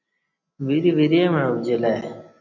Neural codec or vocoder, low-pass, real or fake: none; 7.2 kHz; real